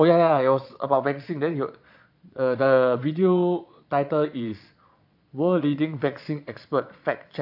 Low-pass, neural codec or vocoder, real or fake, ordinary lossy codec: 5.4 kHz; vocoder, 22.05 kHz, 80 mel bands, Vocos; fake; AAC, 48 kbps